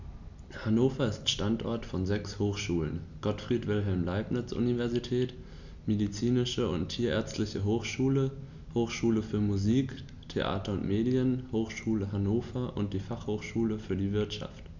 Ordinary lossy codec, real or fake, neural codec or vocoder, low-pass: none; real; none; 7.2 kHz